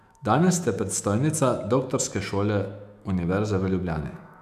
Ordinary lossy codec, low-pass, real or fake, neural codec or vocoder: none; 14.4 kHz; fake; autoencoder, 48 kHz, 128 numbers a frame, DAC-VAE, trained on Japanese speech